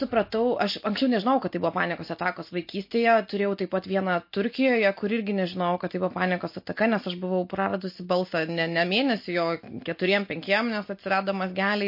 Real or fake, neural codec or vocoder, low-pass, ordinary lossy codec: real; none; 5.4 kHz; MP3, 32 kbps